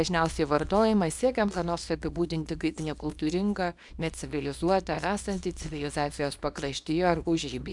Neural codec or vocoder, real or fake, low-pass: codec, 24 kHz, 0.9 kbps, WavTokenizer, small release; fake; 10.8 kHz